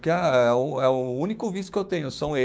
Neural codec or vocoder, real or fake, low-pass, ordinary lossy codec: codec, 16 kHz, 6 kbps, DAC; fake; none; none